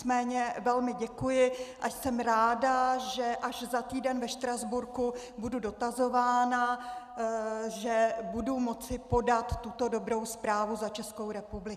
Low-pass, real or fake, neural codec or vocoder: 14.4 kHz; real; none